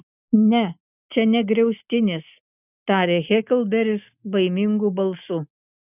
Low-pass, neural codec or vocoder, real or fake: 3.6 kHz; none; real